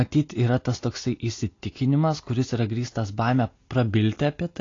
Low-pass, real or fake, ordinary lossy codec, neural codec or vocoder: 7.2 kHz; real; AAC, 32 kbps; none